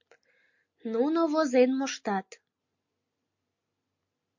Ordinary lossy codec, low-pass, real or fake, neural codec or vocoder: MP3, 48 kbps; 7.2 kHz; real; none